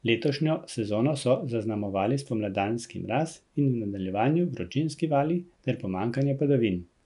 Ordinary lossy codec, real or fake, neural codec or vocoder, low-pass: none; real; none; 10.8 kHz